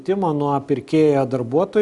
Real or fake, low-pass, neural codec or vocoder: real; 10.8 kHz; none